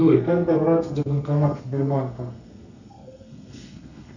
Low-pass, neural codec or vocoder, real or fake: 7.2 kHz; codec, 32 kHz, 1.9 kbps, SNAC; fake